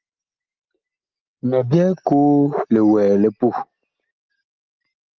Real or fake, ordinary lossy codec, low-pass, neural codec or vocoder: real; Opus, 24 kbps; 7.2 kHz; none